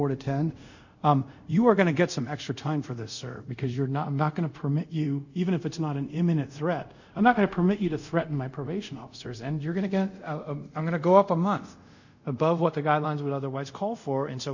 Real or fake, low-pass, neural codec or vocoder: fake; 7.2 kHz; codec, 24 kHz, 0.5 kbps, DualCodec